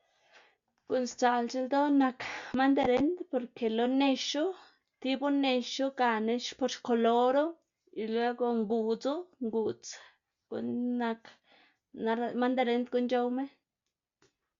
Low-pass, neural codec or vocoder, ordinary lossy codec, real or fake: 7.2 kHz; none; Opus, 64 kbps; real